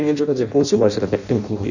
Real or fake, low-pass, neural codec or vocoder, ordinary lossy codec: fake; 7.2 kHz; codec, 16 kHz in and 24 kHz out, 0.6 kbps, FireRedTTS-2 codec; none